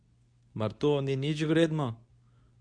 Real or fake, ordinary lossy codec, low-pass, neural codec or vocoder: fake; none; 9.9 kHz; codec, 24 kHz, 0.9 kbps, WavTokenizer, medium speech release version 2